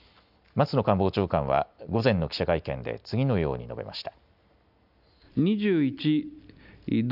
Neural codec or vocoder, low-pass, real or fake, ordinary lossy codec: none; 5.4 kHz; real; none